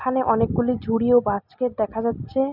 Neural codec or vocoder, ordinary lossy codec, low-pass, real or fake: none; none; 5.4 kHz; real